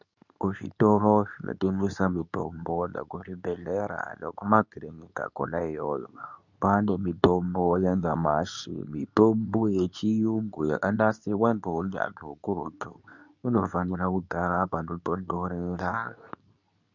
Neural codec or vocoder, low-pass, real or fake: codec, 24 kHz, 0.9 kbps, WavTokenizer, medium speech release version 2; 7.2 kHz; fake